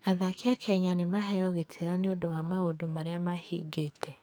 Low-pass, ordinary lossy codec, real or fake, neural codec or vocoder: none; none; fake; codec, 44.1 kHz, 2.6 kbps, SNAC